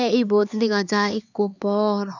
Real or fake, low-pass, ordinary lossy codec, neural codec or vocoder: fake; 7.2 kHz; none; codec, 16 kHz, 4 kbps, X-Codec, HuBERT features, trained on LibriSpeech